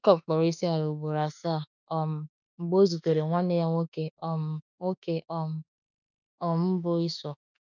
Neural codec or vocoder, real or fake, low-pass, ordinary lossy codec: autoencoder, 48 kHz, 32 numbers a frame, DAC-VAE, trained on Japanese speech; fake; 7.2 kHz; none